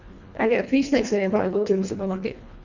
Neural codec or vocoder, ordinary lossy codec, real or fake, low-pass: codec, 24 kHz, 1.5 kbps, HILCodec; none; fake; 7.2 kHz